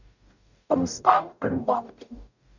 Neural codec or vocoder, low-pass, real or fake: codec, 44.1 kHz, 0.9 kbps, DAC; 7.2 kHz; fake